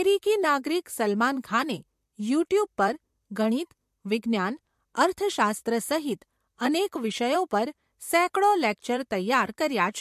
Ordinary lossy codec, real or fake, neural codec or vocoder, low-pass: MP3, 64 kbps; fake; vocoder, 44.1 kHz, 128 mel bands, Pupu-Vocoder; 14.4 kHz